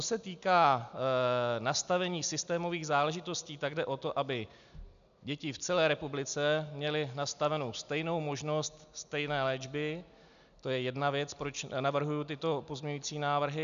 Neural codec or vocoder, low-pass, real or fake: none; 7.2 kHz; real